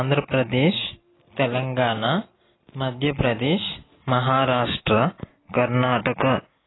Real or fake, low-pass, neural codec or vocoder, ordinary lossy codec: real; 7.2 kHz; none; AAC, 16 kbps